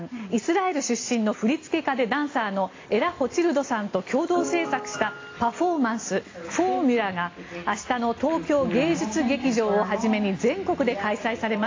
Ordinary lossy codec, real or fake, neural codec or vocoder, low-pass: AAC, 32 kbps; real; none; 7.2 kHz